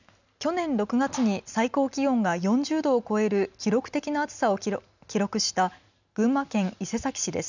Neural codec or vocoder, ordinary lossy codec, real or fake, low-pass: none; none; real; 7.2 kHz